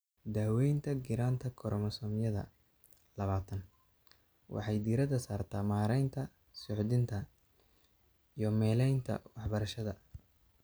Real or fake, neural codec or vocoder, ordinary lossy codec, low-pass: real; none; none; none